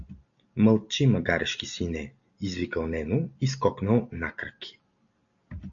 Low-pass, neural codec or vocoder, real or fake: 7.2 kHz; none; real